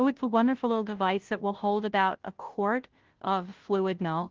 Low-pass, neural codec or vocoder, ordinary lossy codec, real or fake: 7.2 kHz; codec, 16 kHz, 0.5 kbps, FunCodec, trained on Chinese and English, 25 frames a second; Opus, 16 kbps; fake